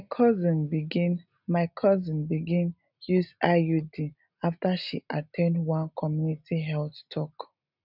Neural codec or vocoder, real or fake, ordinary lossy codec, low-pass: none; real; none; 5.4 kHz